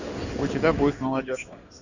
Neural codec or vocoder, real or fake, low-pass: codec, 16 kHz in and 24 kHz out, 1 kbps, XY-Tokenizer; fake; 7.2 kHz